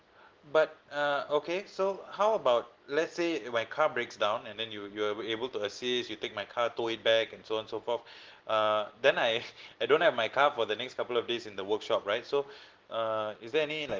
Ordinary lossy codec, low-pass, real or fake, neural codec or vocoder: Opus, 16 kbps; 7.2 kHz; real; none